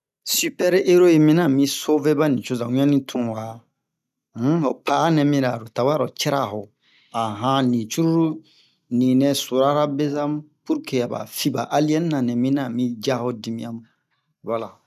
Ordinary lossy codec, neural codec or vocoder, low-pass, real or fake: none; none; 14.4 kHz; real